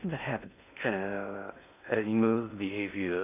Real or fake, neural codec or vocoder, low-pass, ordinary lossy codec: fake; codec, 16 kHz in and 24 kHz out, 0.6 kbps, FocalCodec, streaming, 2048 codes; 3.6 kHz; none